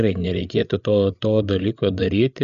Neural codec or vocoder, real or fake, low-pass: codec, 16 kHz, 8 kbps, FreqCodec, larger model; fake; 7.2 kHz